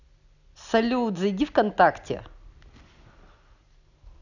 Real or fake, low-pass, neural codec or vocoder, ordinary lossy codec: real; 7.2 kHz; none; none